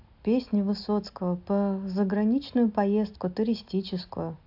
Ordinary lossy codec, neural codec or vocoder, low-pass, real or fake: AAC, 48 kbps; none; 5.4 kHz; real